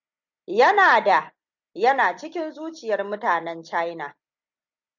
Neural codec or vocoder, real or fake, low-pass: none; real; 7.2 kHz